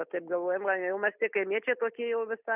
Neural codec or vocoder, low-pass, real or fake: none; 3.6 kHz; real